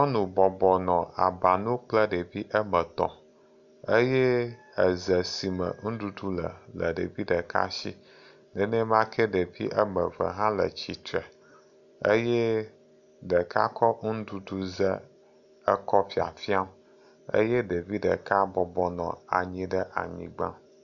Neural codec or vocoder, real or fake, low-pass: none; real; 7.2 kHz